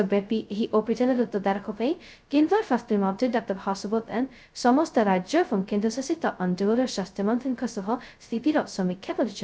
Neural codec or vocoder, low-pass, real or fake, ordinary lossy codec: codec, 16 kHz, 0.2 kbps, FocalCodec; none; fake; none